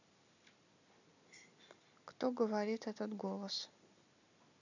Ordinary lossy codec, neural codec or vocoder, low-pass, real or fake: none; none; 7.2 kHz; real